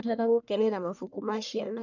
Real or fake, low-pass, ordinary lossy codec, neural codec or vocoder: fake; 7.2 kHz; none; codec, 44.1 kHz, 1.7 kbps, Pupu-Codec